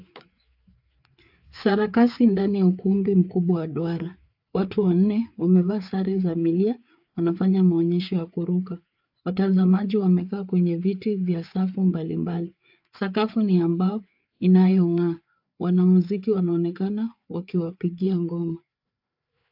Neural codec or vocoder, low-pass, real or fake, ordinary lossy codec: codec, 16 kHz, 4 kbps, FreqCodec, larger model; 5.4 kHz; fake; AAC, 48 kbps